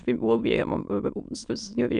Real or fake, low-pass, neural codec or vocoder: fake; 9.9 kHz; autoencoder, 22.05 kHz, a latent of 192 numbers a frame, VITS, trained on many speakers